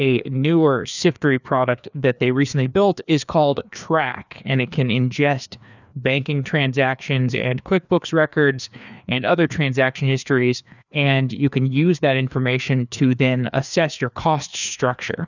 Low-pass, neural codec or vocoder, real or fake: 7.2 kHz; codec, 16 kHz, 2 kbps, FreqCodec, larger model; fake